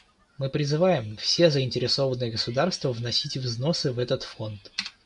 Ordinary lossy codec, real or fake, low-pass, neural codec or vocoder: MP3, 64 kbps; real; 10.8 kHz; none